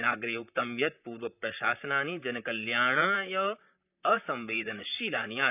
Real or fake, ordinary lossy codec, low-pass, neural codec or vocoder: fake; none; 3.6 kHz; vocoder, 44.1 kHz, 128 mel bands, Pupu-Vocoder